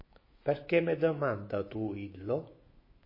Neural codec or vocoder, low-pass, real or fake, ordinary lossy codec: autoencoder, 48 kHz, 128 numbers a frame, DAC-VAE, trained on Japanese speech; 5.4 kHz; fake; MP3, 24 kbps